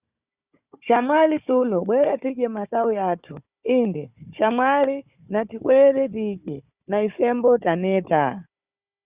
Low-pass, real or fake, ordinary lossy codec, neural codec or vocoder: 3.6 kHz; fake; Opus, 64 kbps; codec, 16 kHz, 4 kbps, FunCodec, trained on Chinese and English, 50 frames a second